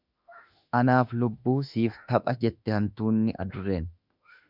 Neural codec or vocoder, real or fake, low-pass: autoencoder, 48 kHz, 32 numbers a frame, DAC-VAE, trained on Japanese speech; fake; 5.4 kHz